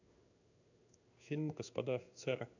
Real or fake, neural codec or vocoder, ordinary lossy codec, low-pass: fake; codec, 16 kHz in and 24 kHz out, 1 kbps, XY-Tokenizer; none; 7.2 kHz